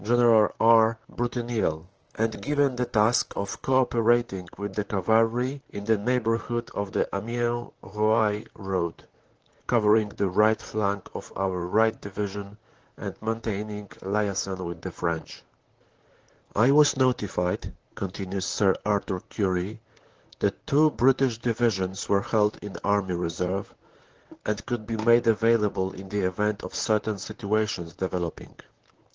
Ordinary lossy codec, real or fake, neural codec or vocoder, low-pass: Opus, 16 kbps; fake; vocoder, 44.1 kHz, 128 mel bands, Pupu-Vocoder; 7.2 kHz